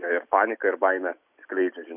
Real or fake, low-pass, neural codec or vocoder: real; 3.6 kHz; none